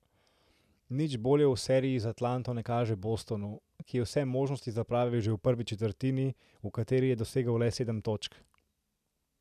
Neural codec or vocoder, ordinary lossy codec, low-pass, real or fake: none; none; 14.4 kHz; real